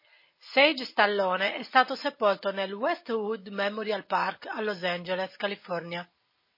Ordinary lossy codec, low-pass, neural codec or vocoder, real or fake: MP3, 24 kbps; 5.4 kHz; none; real